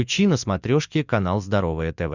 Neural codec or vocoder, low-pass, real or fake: vocoder, 44.1 kHz, 80 mel bands, Vocos; 7.2 kHz; fake